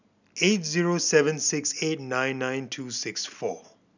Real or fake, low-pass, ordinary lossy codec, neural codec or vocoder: real; 7.2 kHz; none; none